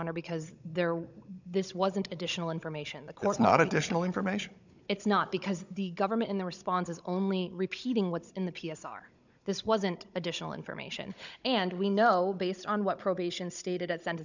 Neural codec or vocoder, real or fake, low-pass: codec, 16 kHz, 16 kbps, FunCodec, trained on Chinese and English, 50 frames a second; fake; 7.2 kHz